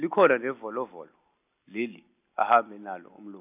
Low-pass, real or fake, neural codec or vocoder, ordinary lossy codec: 3.6 kHz; real; none; AAC, 32 kbps